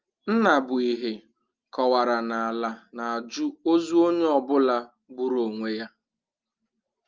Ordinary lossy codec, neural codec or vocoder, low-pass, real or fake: Opus, 24 kbps; none; 7.2 kHz; real